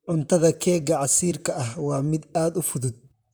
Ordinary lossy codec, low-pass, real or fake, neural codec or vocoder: none; none; fake; vocoder, 44.1 kHz, 128 mel bands, Pupu-Vocoder